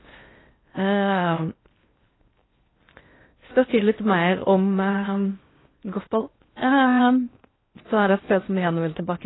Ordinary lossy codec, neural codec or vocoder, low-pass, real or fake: AAC, 16 kbps; codec, 16 kHz in and 24 kHz out, 0.6 kbps, FocalCodec, streaming, 4096 codes; 7.2 kHz; fake